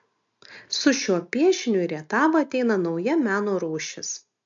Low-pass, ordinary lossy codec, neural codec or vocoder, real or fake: 7.2 kHz; MP3, 64 kbps; none; real